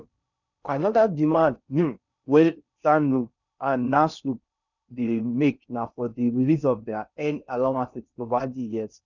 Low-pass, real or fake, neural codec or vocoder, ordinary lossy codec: 7.2 kHz; fake; codec, 16 kHz in and 24 kHz out, 0.6 kbps, FocalCodec, streaming, 4096 codes; none